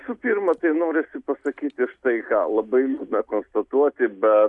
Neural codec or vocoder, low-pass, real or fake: none; 10.8 kHz; real